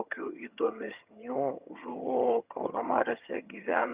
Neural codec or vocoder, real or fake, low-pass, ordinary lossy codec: vocoder, 22.05 kHz, 80 mel bands, HiFi-GAN; fake; 3.6 kHz; Opus, 64 kbps